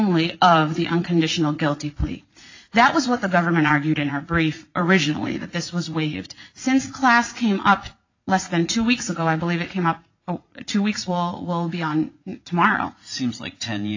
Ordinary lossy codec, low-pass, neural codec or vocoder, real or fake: AAC, 48 kbps; 7.2 kHz; vocoder, 44.1 kHz, 80 mel bands, Vocos; fake